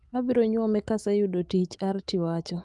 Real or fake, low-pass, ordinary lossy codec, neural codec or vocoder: fake; 10.8 kHz; Opus, 32 kbps; autoencoder, 48 kHz, 128 numbers a frame, DAC-VAE, trained on Japanese speech